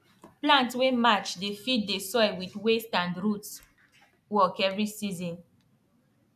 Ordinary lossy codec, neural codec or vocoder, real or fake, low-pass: none; none; real; 14.4 kHz